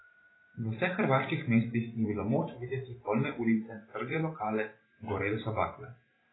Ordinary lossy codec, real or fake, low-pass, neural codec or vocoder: AAC, 16 kbps; fake; 7.2 kHz; vocoder, 44.1 kHz, 128 mel bands every 512 samples, BigVGAN v2